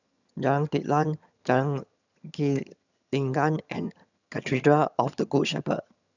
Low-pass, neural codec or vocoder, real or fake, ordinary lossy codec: 7.2 kHz; vocoder, 22.05 kHz, 80 mel bands, HiFi-GAN; fake; none